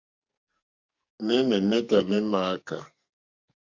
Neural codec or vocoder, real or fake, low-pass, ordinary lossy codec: codec, 44.1 kHz, 2.6 kbps, SNAC; fake; 7.2 kHz; Opus, 64 kbps